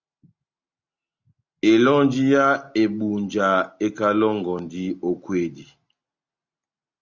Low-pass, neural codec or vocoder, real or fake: 7.2 kHz; none; real